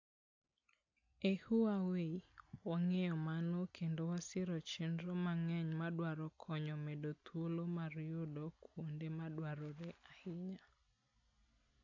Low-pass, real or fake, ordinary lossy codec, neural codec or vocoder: 7.2 kHz; real; AAC, 48 kbps; none